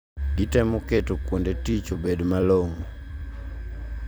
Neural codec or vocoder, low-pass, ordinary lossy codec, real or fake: vocoder, 44.1 kHz, 128 mel bands every 256 samples, BigVGAN v2; none; none; fake